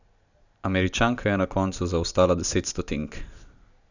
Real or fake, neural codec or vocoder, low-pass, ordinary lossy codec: real; none; 7.2 kHz; none